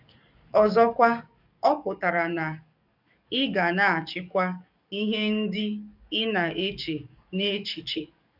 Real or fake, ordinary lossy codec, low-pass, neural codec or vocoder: fake; none; 5.4 kHz; codec, 44.1 kHz, 7.8 kbps, DAC